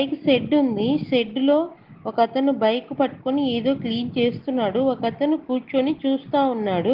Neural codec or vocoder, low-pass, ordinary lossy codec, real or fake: none; 5.4 kHz; Opus, 16 kbps; real